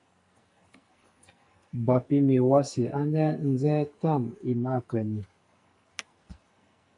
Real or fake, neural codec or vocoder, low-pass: fake; codec, 44.1 kHz, 2.6 kbps, SNAC; 10.8 kHz